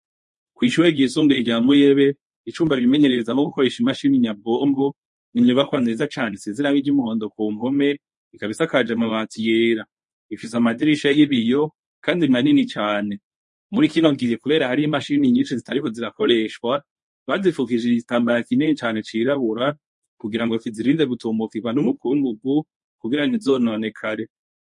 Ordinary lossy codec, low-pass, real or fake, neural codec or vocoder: MP3, 48 kbps; 10.8 kHz; fake; codec, 24 kHz, 0.9 kbps, WavTokenizer, medium speech release version 1